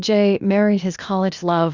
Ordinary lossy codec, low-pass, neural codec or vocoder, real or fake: Opus, 64 kbps; 7.2 kHz; codec, 16 kHz, 0.8 kbps, ZipCodec; fake